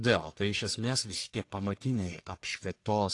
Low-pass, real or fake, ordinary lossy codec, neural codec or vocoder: 10.8 kHz; fake; AAC, 48 kbps; codec, 44.1 kHz, 1.7 kbps, Pupu-Codec